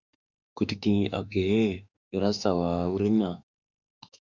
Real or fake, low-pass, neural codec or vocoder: fake; 7.2 kHz; autoencoder, 48 kHz, 32 numbers a frame, DAC-VAE, trained on Japanese speech